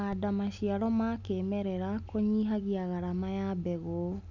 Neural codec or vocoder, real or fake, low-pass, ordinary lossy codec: none; real; 7.2 kHz; none